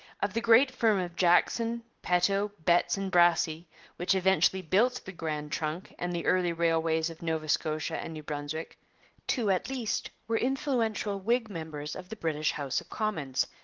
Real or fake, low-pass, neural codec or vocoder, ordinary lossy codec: real; 7.2 kHz; none; Opus, 16 kbps